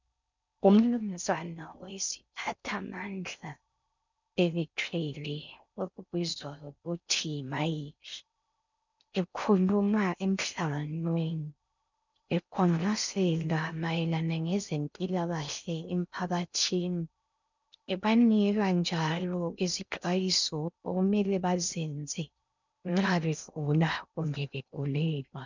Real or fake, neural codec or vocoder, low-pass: fake; codec, 16 kHz in and 24 kHz out, 0.6 kbps, FocalCodec, streaming, 4096 codes; 7.2 kHz